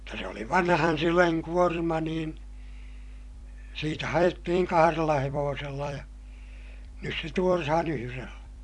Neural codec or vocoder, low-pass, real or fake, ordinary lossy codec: vocoder, 44.1 kHz, 128 mel bands every 256 samples, BigVGAN v2; 10.8 kHz; fake; AAC, 64 kbps